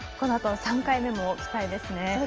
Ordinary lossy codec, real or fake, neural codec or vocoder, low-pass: Opus, 24 kbps; real; none; 7.2 kHz